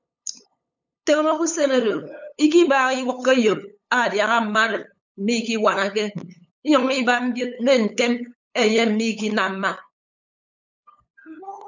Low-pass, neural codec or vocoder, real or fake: 7.2 kHz; codec, 16 kHz, 8 kbps, FunCodec, trained on LibriTTS, 25 frames a second; fake